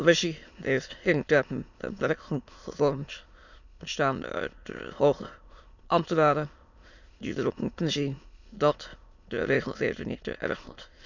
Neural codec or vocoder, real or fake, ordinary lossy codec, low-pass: autoencoder, 22.05 kHz, a latent of 192 numbers a frame, VITS, trained on many speakers; fake; none; 7.2 kHz